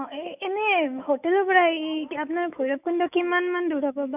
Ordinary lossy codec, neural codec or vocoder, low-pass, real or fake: none; autoencoder, 48 kHz, 128 numbers a frame, DAC-VAE, trained on Japanese speech; 3.6 kHz; fake